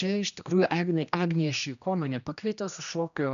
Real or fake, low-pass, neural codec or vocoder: fake; 7.2 kHz; codec, 16 kHz, 1 kbps, X-Codec, HuBERT features, trained on general audio